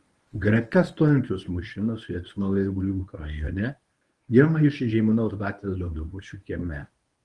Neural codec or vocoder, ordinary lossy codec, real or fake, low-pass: codec, 24 kHz, 0.9 kbps, WavTokenizer, medium speech release version 1; Opus, 24 kbps; fake; 10.8 kHz